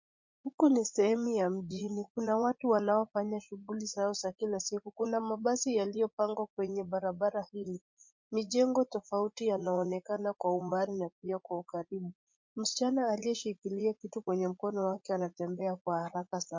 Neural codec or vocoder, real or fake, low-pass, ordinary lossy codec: vocoder, 44.1 kHz, 80 mel bands, Vocos; fake; 7.2 kHz; MP3, 48 kbps